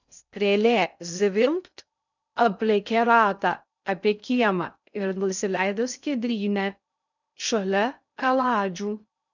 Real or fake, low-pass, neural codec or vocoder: fake; 7.2 kHz; codec, 16 kHz in and 24 kHz out, 0.6 kbps, FocalCodec, streaming, 2048 codes